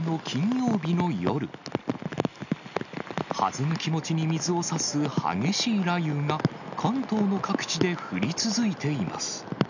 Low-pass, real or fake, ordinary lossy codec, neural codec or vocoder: 7.2 kHz; real; none; none